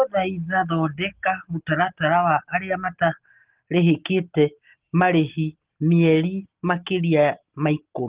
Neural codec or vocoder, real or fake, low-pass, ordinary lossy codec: none; real; 3.6 kHz; Opus, 16 kbps